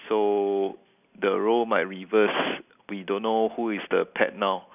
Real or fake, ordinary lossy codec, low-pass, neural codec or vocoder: real; none; 3.6 kHz; none